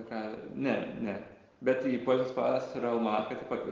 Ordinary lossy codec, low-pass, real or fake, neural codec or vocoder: Opus, 16 kbps; 7.2 kHz; real; none